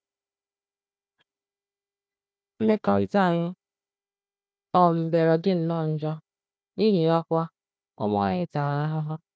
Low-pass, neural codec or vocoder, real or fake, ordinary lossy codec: none; codec, 16 kHz, 1 kbps, FunCodec, trained on Chinese and English, 50 frames a second; fake; none